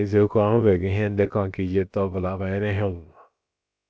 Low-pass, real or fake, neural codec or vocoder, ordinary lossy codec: none; fake; codec, 16 kHz, about 1 kbps, DyCAST, with the encoder's durations; none